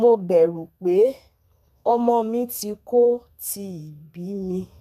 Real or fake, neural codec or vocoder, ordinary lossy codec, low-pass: fake; codec, 32 kHz, 1.9 kbps, SNAC; none; 14.4 kHz